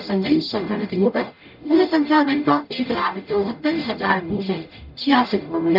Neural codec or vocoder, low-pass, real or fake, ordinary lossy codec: codec, 44.1 kHz, 0.9 kbps, DAC; 5.4 kHz; fake; none